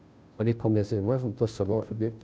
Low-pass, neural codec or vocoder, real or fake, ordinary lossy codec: none; codec, 16 kHz, 0.5 kbps, FunCodec, trained on Chinese and English, 25 frames a second; fake; none